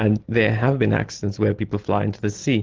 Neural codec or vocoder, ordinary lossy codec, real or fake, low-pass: none; Opus, 16 kbps; real; 7.2 kHz